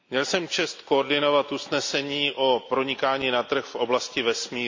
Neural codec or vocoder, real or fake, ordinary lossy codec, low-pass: none; real; MP3, 48 kbps; 7.2 kHz